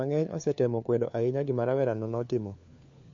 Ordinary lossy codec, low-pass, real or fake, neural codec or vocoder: MP3, 48 kbps; 7.2 kHz; fake; codec, 16 kHz, 4 kbps, FunCodec, trained on LibriTTS, 50 frames a second